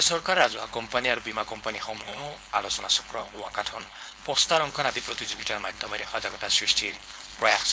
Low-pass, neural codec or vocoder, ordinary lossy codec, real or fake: none; codec, 16 kHz, 4.8 kbps, FACodec; none; fake